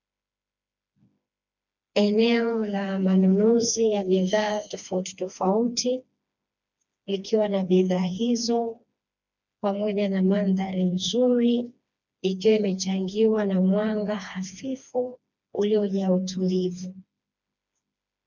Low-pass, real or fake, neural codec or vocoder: 7.2 kHz; fake; codec, 16 kHz, 2 kbps, FreqCodec, smaller model